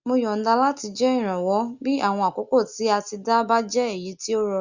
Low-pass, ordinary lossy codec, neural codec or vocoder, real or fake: 7.2 kHz; Opus, 64 kbps; none; real